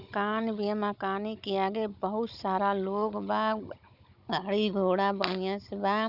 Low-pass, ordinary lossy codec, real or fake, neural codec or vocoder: 5.4 kHz; none; fake; codec, 16 kHz, 16 kbps, FunCodec, trained on Chinese and English, 50 frames a second